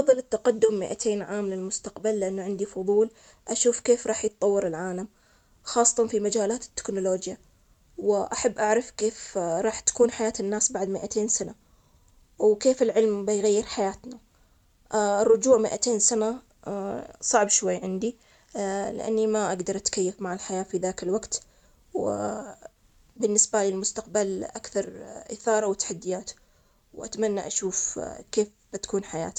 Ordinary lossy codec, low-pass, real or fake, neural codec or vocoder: none; 19.8 kHz; real; none